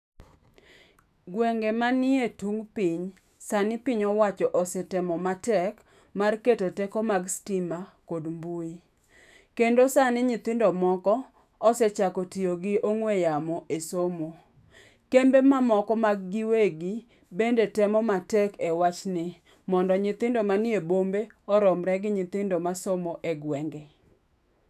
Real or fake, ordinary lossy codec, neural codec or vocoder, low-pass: fake; none; autoencoder, 48 kHz, 128 numbers a frame, DAC-VAE, trained on Japanese speech; 14.4 kHz